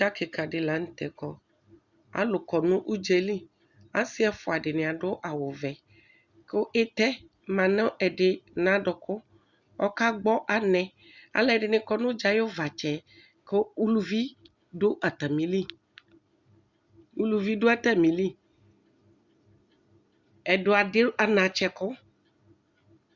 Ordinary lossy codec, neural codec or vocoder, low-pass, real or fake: Opus, 64 kbps; none; 7.2 kHz; real